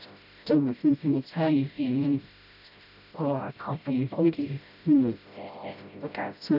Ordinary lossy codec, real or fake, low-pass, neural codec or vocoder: none; fake; 5.4 kHz; codec, 16 kHz, 0.5 kbps, FreqCodec, smaller model